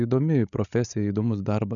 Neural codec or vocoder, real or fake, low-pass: codec, 16 kHz, 16 kbps, FreqCodec, larger model; fake; 7.2 kHz